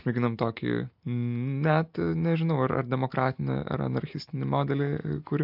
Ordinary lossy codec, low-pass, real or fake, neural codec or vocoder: MP3, 48 kbps; 5.4 kHz; real; none